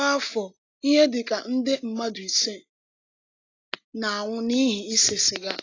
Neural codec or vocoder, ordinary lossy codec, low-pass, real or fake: none; AAC, 32 kbps; 7.2 kHz; real